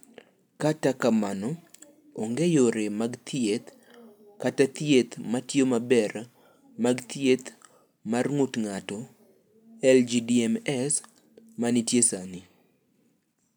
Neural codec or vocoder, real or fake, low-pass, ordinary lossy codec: none; real; none; none